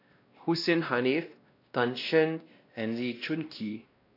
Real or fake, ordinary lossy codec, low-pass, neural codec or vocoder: fake; AAC, 32 kbps; 5.4 kHz; codec, 16 kHz, 1 kbps, X-Codec, WavLM features, trained on Multilingual LibriSpeech